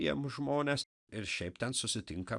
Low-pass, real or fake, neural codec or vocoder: 10.8 kHz; real; none